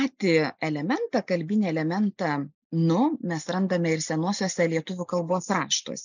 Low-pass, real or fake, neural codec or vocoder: 7.2 kHz; real; none